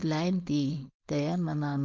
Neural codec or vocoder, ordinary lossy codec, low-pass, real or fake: codec, 16 kHz, 4.8 kbps, FACodec; Opus, 32 kbps; 7.2 kHz; fake